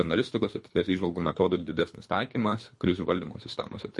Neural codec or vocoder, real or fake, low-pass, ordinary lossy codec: codec, 24 kHz, 3 kbps, HILCodec; fake; 10.8 kHz; MP3, 48 kbps